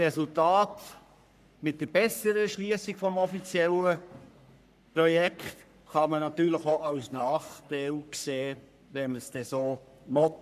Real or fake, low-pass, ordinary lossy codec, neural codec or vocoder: fake; 14.4 kHz; none; codec, 44.1 kHz, 3.4 kbps, Pupu-Codec